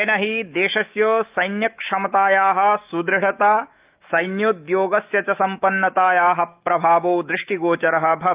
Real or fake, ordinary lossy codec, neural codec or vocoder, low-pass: fake; Opus, 32 kbps; autoencoder, 48 kHz, 128 numbers a frame, DAC-VAE, trained on Japanese speech; 3.6 kHz